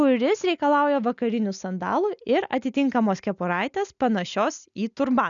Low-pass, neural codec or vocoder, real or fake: 7.2 kHz; none; real